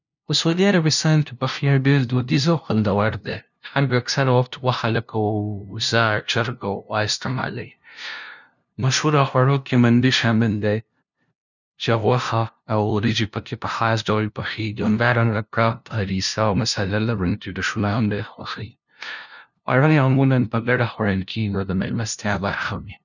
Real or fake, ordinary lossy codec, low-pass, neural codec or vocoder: fake; none; 7.2 kHz; codec, 16 kHz, 0.5 kbps, FunCodec, trained on LibriTTS, 25 frames a second